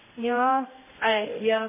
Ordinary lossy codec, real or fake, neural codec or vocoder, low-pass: MP3, 16 kbps; fake; codec, 16 kHz, 1 kbps, X-Codec, HuBERT features, trained on general audio; 3.6 kHz